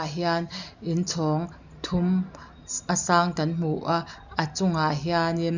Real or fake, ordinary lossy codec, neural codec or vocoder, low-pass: real; none; none; 7.2 kHz